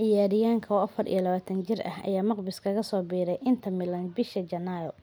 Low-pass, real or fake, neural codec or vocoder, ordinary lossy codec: none; real; none; none